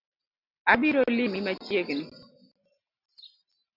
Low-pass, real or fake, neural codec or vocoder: 5.4 kHz; real; none